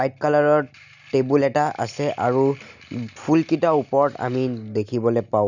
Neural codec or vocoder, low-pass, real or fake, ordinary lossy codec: none; 7.2 kHz; real; none